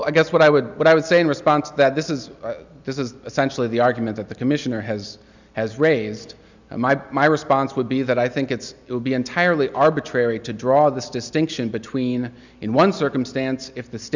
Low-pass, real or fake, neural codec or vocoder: 7.2 kHz; real; none